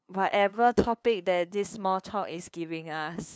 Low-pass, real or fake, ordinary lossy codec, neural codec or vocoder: none; fake; none; codec, 16 kHz, 2 kbps, FunCodec, trained on LibriTTS, 25 frames a second